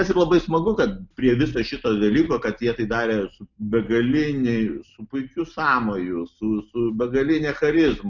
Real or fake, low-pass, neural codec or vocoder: real; 7.2 kHz; none